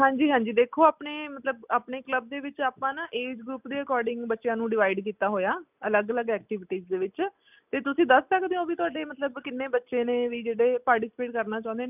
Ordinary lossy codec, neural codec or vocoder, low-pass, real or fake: none; none; 3.6 kHz; real